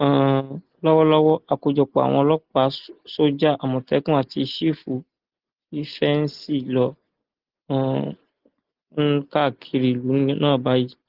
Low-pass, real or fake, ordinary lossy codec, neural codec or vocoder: 5.4 kHz; real; Opus, 16 kbps; none